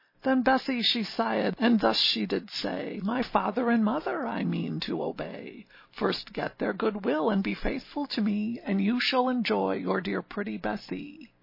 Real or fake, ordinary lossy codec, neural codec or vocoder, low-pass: real; MP3, 24 kbps; none; 5.4 kHz